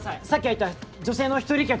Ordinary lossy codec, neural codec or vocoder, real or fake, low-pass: none; none; real; none